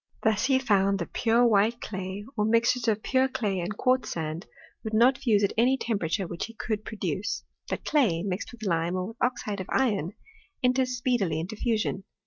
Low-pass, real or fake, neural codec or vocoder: 7.2 kHz; real; none